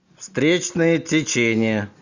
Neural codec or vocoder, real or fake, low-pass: none; real; 7.2 kHz